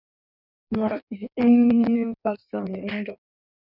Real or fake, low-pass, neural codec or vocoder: fake; 5.4 kHz; codec, 16 kHz in and 24 kHz out, 1.1 kbps, FireRedTTS-2 codec